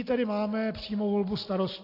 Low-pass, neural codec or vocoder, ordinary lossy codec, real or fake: 5.4 kHz; none; AAC, 32 kbps; real